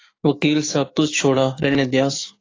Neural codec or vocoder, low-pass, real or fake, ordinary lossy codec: codec, 16 kHz, 6 kbps, DAC; 7.2 kHz; fake; AAC, 32 kbps